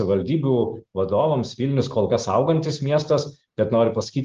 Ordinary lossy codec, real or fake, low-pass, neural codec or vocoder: Opus, 24 kbps; real; 7.2 kHz; none